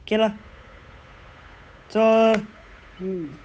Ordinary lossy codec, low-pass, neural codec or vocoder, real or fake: none; none; codec, 16 kHz, 8 kbps, FunCodec, trained on Chinese and English, 25 frames a second; fake